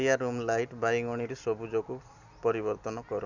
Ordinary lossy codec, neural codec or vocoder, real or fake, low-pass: Opus, 64 kbps; none; real; 7.2 kHz